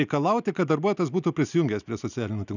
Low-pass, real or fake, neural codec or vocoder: 7.2 kHz; real; none